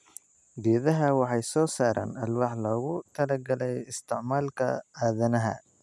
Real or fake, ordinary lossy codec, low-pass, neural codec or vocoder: real; none; none; none